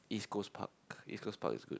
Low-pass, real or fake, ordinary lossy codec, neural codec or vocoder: none; real; none; none